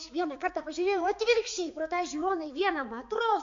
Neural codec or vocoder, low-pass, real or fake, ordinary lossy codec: codec, 16 kHz, 4 kbps, X-Codec, HuBERT features, trained on balanced general audio; 7.2 kHz; fake; AAC, 48 kbps